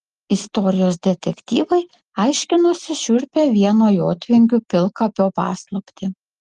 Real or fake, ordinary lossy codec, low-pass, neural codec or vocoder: real; Opus, 24 kbps; 10.8 kHz; none